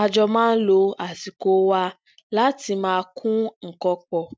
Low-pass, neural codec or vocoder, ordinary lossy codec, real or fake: none; none; none; real